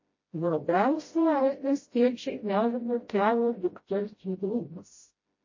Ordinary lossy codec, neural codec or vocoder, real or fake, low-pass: MP3, 32 kbps; codec, 16 kHz, 0.5 kbps, FreqCodec, smaller model; fake; 7.2 kHz